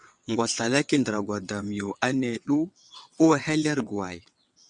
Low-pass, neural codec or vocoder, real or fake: 9.9 kHz; vocoder, 22.05 kHz, 80 mel bands, WaveNeXt; fake